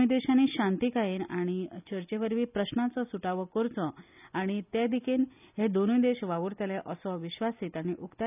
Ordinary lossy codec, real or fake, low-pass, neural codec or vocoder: none; real; 3.6 kHz; none